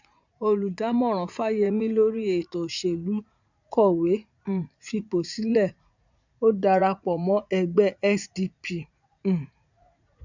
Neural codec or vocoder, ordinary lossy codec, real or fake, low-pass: vocoder, 44.1 kHz, 80 mel bands, Vocos; none; fake; 7.2 kHz